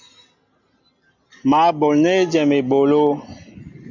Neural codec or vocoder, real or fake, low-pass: none; real; 7.2 kHz